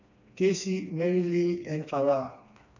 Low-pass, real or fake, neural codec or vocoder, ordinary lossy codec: 7.2 kHz; fake; codec, 16 kHz, 2 kbps, FreqCodec, smaller model; none